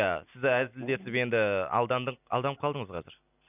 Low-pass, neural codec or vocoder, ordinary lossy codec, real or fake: 3.6 kHz; none; none; real